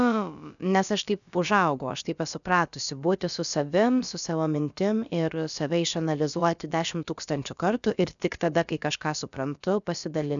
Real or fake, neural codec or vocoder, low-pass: fake; codec, 16 kHz, about 1 kbps, DyCAST, with the encoder's durations; 7.2 kHz